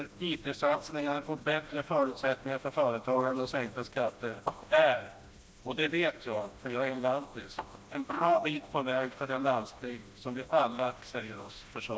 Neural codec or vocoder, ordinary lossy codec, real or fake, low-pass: codec, 16 kHz, 1 kbps, FreqCodec, smaller model; none; fake; none